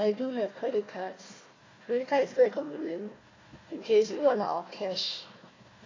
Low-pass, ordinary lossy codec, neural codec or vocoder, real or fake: 7.2 kHz; AAC, 32 kbps; codec, 16 kHz, 1 kbps, FunCodec, trained on Chinese and English, 50 frames a second; fake